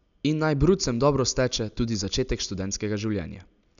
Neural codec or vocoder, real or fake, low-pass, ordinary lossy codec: none; real; 7.2 kHz; none